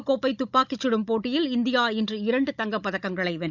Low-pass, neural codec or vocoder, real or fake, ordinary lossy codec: 7.2 kHz; codec, 16 kHz, 16 kbps, FunCodec, trained on Chinese and English, 50 frames a second; fake; none